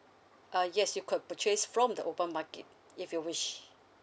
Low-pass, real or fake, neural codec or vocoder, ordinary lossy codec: none; real; none; none